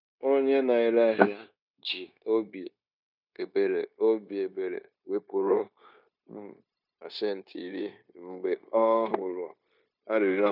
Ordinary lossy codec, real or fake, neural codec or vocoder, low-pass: none; fake; codec, 16 kHz, 0.9 kbps, LongCat-Audio-Codec; 5.4 kHz